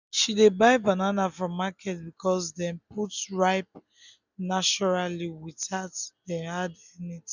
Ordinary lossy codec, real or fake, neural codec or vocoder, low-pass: none; real; none; 7.2 kHz